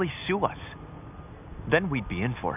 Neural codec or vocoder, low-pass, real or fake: none; 3.6 kHz; real